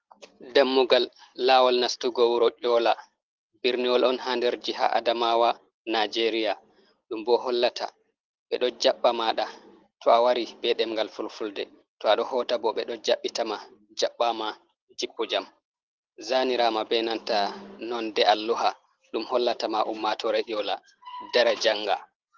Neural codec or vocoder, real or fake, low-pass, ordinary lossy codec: none; real; 7.2 kHz; Opus, 16 kbps